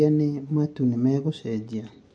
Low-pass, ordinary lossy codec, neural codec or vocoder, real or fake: 9.9 kHz; MP3, 48 kbps; none; real